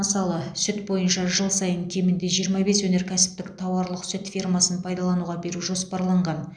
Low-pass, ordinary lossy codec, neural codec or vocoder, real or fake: 9.9 kHz; none; none; real